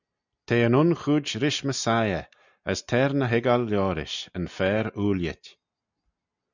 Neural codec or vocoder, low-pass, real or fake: none; 7.2 kHz; real